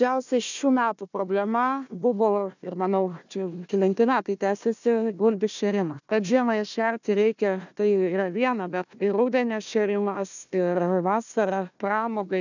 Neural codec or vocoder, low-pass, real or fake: codec, 16 kHz, 1 kbps, FunCodec, trained on Chinese and English, 50 frames a second; 7.2 kHz; fake